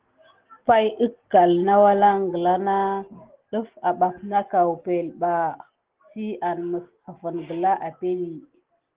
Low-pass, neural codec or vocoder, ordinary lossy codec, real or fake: 3.6 kHz; none; Opus, 16 kbps; real